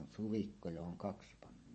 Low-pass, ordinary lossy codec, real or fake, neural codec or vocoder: 9.9 kHz; MP3, 32 kbps; fake; vocoder, 24 kHz, 100 mel bands, Vocos